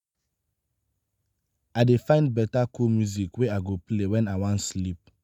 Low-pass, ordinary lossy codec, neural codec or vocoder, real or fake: 19.8 kHz; none; none; real